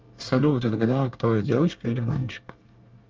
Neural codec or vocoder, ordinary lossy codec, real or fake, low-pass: codec, 24 kHz, 1 kbps, SNAC; Opus, 24 kbps; fake; 7.2 kHz